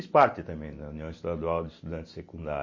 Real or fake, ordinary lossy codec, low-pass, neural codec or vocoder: real; MP3, 32 kbps; 7.2 kHz; none